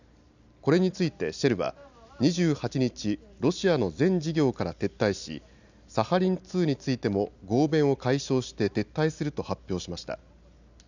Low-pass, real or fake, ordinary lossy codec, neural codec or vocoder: 7.2 kHz; real; none; none